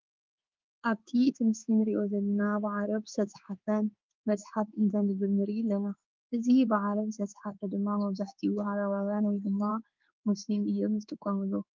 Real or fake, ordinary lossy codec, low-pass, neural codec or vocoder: fake; Opus, 24 kbps; 7.2 kHz; codec, 16 kHz in and 24 kHz out, 1 kbps, XY-Tokenizer